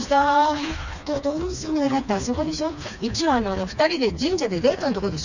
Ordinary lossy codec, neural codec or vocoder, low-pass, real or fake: none; codec, 16 kHz, 2 kbps, FreqCodec, smaller model; 7.2 kHz; fake